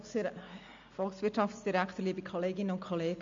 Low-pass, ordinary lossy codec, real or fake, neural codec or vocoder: 7.2 kHz; MP3, 48 kbps; real; none